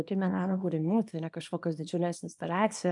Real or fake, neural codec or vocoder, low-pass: fake; codec, 24 kHz, 0.9 kbps, WavTokenizer, small release; 10.8 kHz